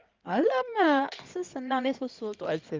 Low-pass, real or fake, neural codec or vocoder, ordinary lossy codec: 7.2 kHz; fake; codec, 16 kHz in and 24 kHz out, 2.2 kbps, FireRedTTS-2 codec; Opus, 24 kbps